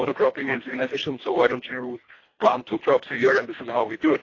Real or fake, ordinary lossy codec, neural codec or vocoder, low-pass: fake; AAC, 32 kbps; codec, 24 kHz, 1.5 kbps, HILCodec; 7.2 kHz